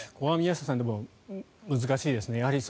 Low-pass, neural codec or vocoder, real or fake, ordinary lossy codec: none; none; real; none